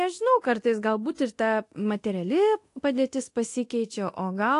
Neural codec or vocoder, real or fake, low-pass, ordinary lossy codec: codec, 24 kHz, 0.9 kbps, DualCodec; fake; 10.8 kHz; AAC, 48 kbps